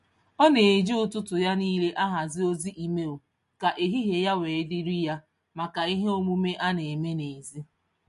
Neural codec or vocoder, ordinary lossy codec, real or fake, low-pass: none; MP3, 48 kbps; real; 14.4 kHz